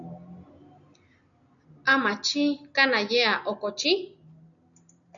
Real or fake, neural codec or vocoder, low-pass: real; none; 7.2 kHz